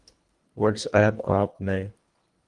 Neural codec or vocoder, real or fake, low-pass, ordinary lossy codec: codec, 24 kHz, 1.5 kbps, HILCodec; fake; 10.8 kHz; Opus, 24 kbps